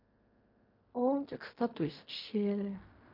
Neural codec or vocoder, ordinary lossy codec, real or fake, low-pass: codec, 16 kHz in and 24 kHz out, 0.4 kbps, LongCat-Audio-Codec, fine tuned four codebook decoder; MP3, 32 kbps; fake; 5.4 kHz